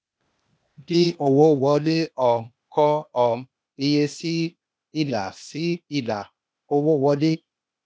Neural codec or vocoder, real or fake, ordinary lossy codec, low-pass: codec, 16 kHz, 0.8 kbps, ZipCodec; fake; none; none